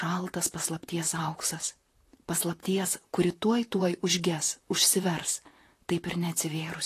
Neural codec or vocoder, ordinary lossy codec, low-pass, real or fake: vocoder, 44.1 kHz, 128 mel bands, Pupu-Vocoder; AAC, 48 kbps; 14.4 kHz; fake